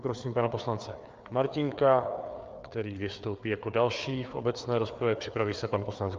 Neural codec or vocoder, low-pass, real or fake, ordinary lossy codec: codec, 16 kHz, 4 kbps, FreqCodec, larger model; 7.2 kHz; fake; Opus, 24 kbps